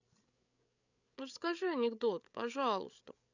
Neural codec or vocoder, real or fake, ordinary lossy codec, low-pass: codec, 16 kHz, 8 kbps, FreqCodec, larger model; fake; none; 7.2 kHz